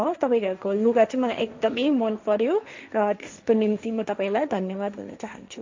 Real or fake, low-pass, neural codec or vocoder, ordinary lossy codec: fake; 7.2 kHz; codec, 16 kHz, 1.1 kbps, Voila-Tokenizer; MP3, 64 kbps